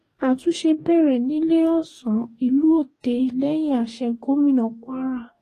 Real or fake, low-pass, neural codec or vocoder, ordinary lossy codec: fake; 14.4 kHz; codec, 44.1 kHz, 2.6 kbps, DAC; AAC, 48 kbps